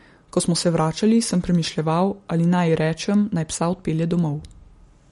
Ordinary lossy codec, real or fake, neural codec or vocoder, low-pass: MP3, 48 kbps; real; none; 19.8 kHz